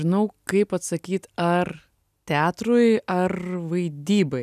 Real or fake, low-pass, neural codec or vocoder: real; 14.4 kHz; none